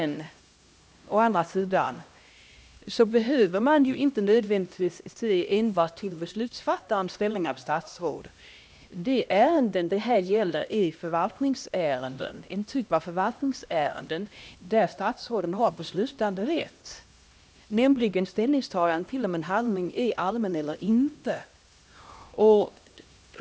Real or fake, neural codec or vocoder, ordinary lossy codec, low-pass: fake; codec, 16 kHz, 1 kbps, X-Codec, HuBERT features, trained on LibriSpeech; none; none